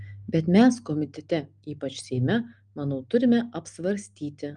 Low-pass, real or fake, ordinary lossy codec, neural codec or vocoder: 9.9 kHz; real; Opus, 32 kbps; none